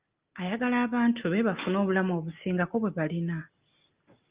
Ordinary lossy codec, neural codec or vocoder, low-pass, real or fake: Opus, 24 kbps; none; 3.6 kHz; real